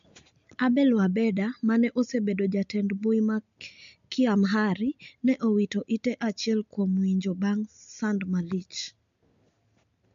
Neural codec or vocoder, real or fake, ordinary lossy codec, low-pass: none; real; MP3, 64 kbps; 7.2 kHz